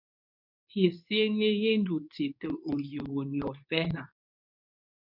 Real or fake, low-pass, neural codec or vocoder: fake; 5.4 kHz; codec, 24 kHz, 0.9 kbps, WavTokenizer, medium speech release version 2